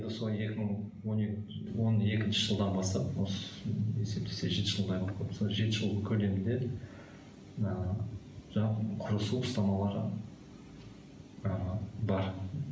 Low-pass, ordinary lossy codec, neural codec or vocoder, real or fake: none; none; none; real